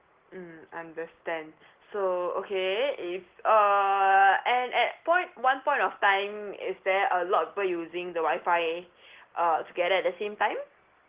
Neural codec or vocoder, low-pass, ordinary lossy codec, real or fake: none; 3.6 kHz; Opus, 16 kbps; real